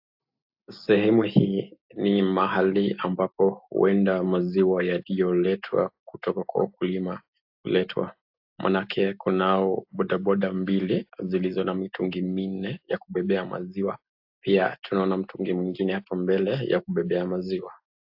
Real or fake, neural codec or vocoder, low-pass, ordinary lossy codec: real; none; 5.4 kHz; AAC, 48 kbps